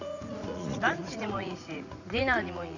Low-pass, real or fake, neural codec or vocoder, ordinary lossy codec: 7.2 kHz; fake; vocoder, 44.1 kHz, 80 mel bands, Vocos; none